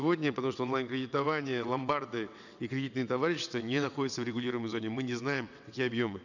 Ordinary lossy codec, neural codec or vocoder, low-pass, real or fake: none; vocoder, 22.05 kHz, 80 mel bands, WaveNeXt; 7.2 kHz; fake